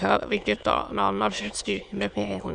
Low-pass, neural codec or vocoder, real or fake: 9.9 kHz; autoencoder, 22.05 kHz, a latent of 192 numbers a frame, VITS, trained on many speakers; fake